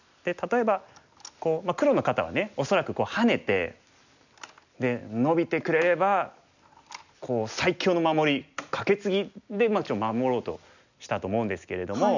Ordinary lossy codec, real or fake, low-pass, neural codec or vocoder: none; real; 7.2 kHz; none